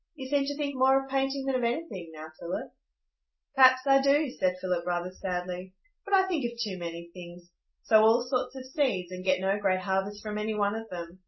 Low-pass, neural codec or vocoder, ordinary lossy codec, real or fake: 7.2 kHz; none; MP3, 24 kbps; real